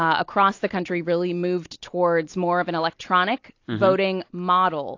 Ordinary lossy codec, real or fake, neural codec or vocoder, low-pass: AAC, 48 kbps; real; none; 7.2 kHz